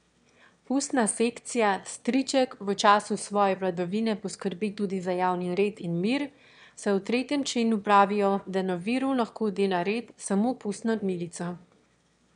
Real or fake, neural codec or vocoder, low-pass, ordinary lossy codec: fake; autoencoder, 22.05 kHz, a latent of 192 numbers a frame, VITS, trained on one speaker; 9.9 kHz; none